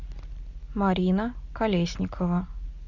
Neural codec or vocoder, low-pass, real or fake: none; 7.2 kHz; real